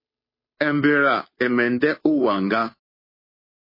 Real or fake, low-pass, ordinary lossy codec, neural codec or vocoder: fake; 5.4 kHz; MP3, 24 kbps; codec, 16 kHz, 2 kbps, FunCodec, trained on Chinese and English, 25 frames a second